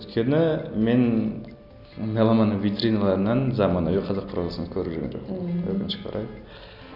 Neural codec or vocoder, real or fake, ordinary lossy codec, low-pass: none; real; none; 5.4 kHz